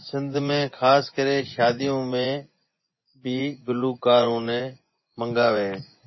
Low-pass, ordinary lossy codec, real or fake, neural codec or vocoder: 7.2 kHz; MP3, 24 kbps; fake; vocoder, 24 kHz, 100 mel bands, Vocos